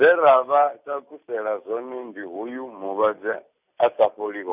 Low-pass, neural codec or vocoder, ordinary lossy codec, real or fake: 3.6 kHz; none; none; real